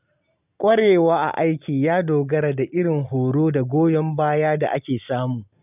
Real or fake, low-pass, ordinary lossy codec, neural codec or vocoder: real; 3.6 kHz; none; none